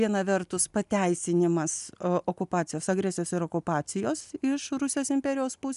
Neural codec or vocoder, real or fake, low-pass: none; real; 10.8 kHz